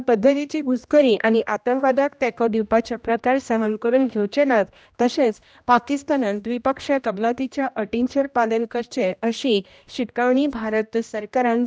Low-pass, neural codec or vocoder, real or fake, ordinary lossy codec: none; codec, 16 kHz, 1 kbps, X-Codec, HuBERT features, trained on general audio; fake; none